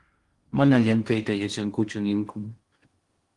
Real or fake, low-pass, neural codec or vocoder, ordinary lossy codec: fake; 10.8 kHz; codec, 16 kHz in and 24 kHz out, 0.8 kbps, FocalCodec, streaming, 65536 codes; Opus, 24 kbps